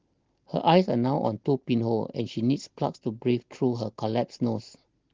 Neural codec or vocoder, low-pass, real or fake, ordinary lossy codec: none; 7.2 kHz; real; Opus, 16 kbps